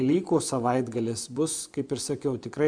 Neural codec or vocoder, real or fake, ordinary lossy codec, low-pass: vocoder, 24 kHz, 100 mel bands, Vocos; fake; MP3, 96 kbps; 9.9 kHz